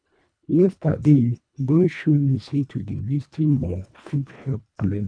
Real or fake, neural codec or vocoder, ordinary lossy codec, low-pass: fake; codec, 24 kHz, 1.5 kbps, HILCodec; none; 9.9 kHz